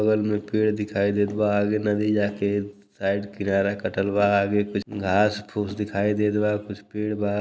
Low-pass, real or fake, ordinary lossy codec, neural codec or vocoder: none; real; none; none